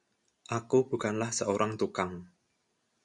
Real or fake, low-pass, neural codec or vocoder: real; 10.8 kHz; none